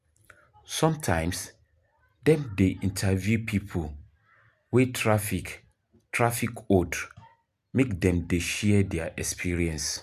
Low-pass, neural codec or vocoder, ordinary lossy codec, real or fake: 14.4 kHz; none; none; real